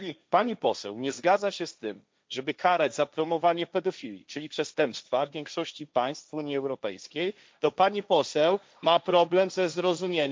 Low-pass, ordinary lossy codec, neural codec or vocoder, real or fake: none; none; codec, 16 kHz, 1.1 kbps, Voila-Tokenizer; fake